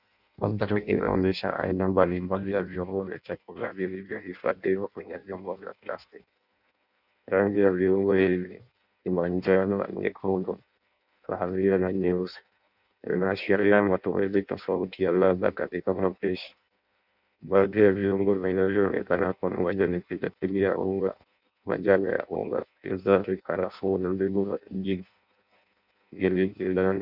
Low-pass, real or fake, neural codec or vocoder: 5.4 kHz; fake; codec, 16 kHz in and 24 kHz out, 0.6 kbps, FireRedTTS-2 codec